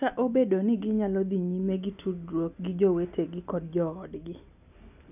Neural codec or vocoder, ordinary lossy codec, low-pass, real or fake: none; none; 3.6 kHz; real